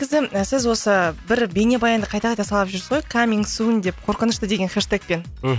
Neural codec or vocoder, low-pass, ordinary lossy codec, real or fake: none; none; none; real